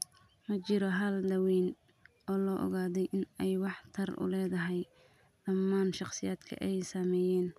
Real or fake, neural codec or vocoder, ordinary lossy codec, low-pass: real; none; none; 14.4 kHz